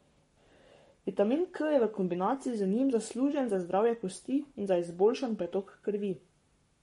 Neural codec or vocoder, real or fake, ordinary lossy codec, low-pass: codec, 44.1 kHz, 7.8 kbps, Pupu-Codec; fake; MP3, 48 kbps; 19.8 kHz